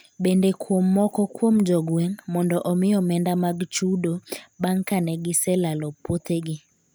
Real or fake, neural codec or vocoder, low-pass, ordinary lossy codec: real; none; none; none